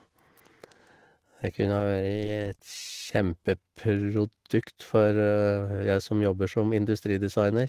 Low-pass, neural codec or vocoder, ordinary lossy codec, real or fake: 14.4 kHz; autoencoder, 48 kHz, 128 numbers a frame, DAC-VAE, trained on Japanese speech; Opus, 16 kbps; fake